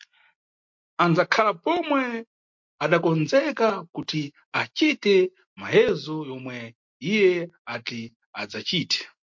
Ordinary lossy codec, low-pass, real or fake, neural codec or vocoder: MP3, 48 kbps; 7.2 kHz; real; none